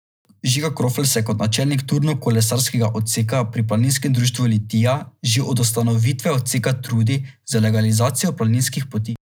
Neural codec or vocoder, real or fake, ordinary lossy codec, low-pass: none; real; none; none